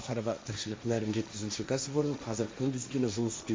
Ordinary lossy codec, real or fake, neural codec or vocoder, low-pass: none; fake; codec, 16 kHz, 1.1 kbps, Voila-Tokenizer; none